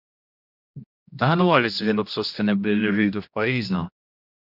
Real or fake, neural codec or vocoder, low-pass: fake; codec, 16 kHz, 1 kbps, X-Codec, HuBERT features, trained on general audio; 5.4 kHz